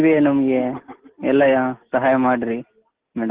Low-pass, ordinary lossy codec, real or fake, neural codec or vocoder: 3.6 kHz; Opus, 16 kbps; real; none